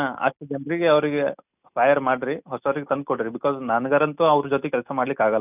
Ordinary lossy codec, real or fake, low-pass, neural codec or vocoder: none; real; 3.6 kHz; none